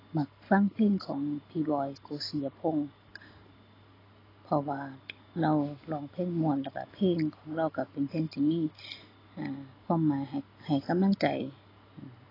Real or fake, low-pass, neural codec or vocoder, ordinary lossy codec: real; 5.4 kHz; none; AAC, 24 kbps